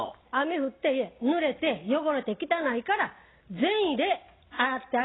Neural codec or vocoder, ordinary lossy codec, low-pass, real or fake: none; AAC, 16 kbps; 7.2 kHz; real